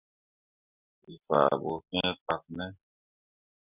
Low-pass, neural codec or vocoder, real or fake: 3.6 kHz; none; real